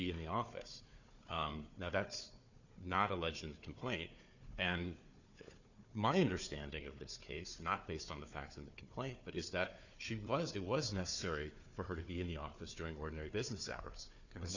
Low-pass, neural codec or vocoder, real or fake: 7.2 kHz; codec, 16 kHz, 4 kbps, FunCodec, trained on Chinese and English, 50 frames a second; fake